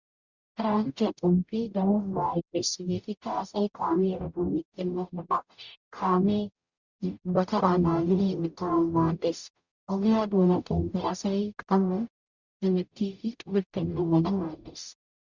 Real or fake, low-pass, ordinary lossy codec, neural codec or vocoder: fake; 7.2 kHz; Opus, 64 kbps; codec, 44.1 kHz, 0.9 kbps, DAC